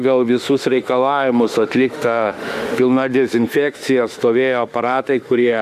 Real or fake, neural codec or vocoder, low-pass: fake; autoencoder, 48 kHz, 32 numbers a frame, DAC-VAE, trained on Japanese speech; 14.4 kHz